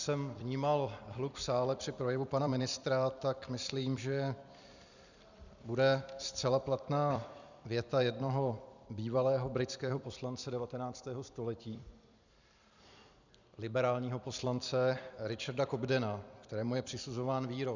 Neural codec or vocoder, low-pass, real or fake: vocoder, 44.1 kHz, 128 mel bands every 256 samples, BigVGAN v2; 7.2 kHz; fake